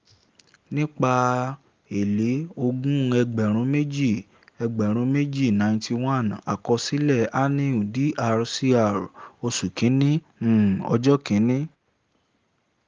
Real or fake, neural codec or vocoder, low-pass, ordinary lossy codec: real; none; 7.2 kHz; Opus, 32 kbps